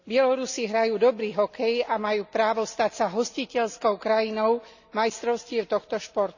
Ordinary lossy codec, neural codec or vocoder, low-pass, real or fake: none; none; 7.2 kHz; real